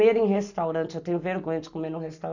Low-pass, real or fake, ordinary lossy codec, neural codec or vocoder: 7.2 kHz; real; none; none